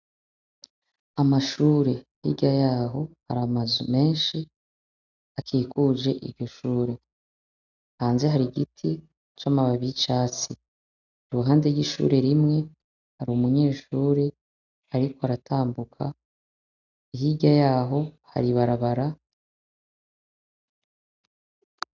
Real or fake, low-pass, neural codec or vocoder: real; 7.2 kHz; none